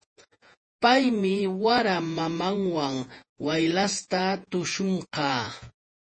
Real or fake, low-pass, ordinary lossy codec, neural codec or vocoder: fake; 9.9 kHz; MP3, 32 kbps; vocoder, 48 kHz, 128 mel bands, Vocos